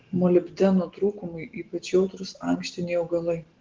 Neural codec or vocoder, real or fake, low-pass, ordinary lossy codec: none; real; 7.2 kHz; Opus, 16 kbps